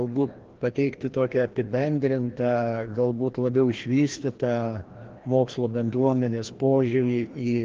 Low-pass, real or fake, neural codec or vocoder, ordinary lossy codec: 7.2 kHz; fake; codec, 16 kHz, 1 kbps, FreqCodec, larger model; Opus, 16 kbps